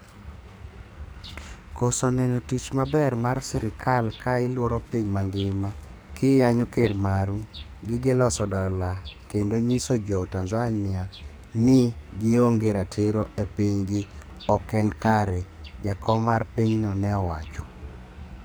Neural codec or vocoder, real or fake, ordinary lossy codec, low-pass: codec, 44.1 kHz, 2.6 kbps, SNAC; fake; none; none